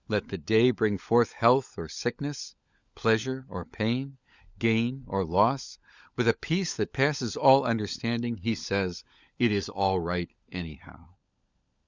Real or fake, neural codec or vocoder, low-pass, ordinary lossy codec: fake; codec, 16 kHz, 16 kbps, FunCodec, trained on LibriTTS, 50 frames a second; 7.2 kHz; Opus, 64 kbps